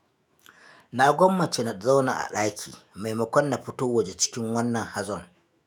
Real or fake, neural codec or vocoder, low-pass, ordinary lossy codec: fake; autoencoder, 48 kHz, 128 numbers a frame, DAC-VAE, trained on Japanese speech; none; none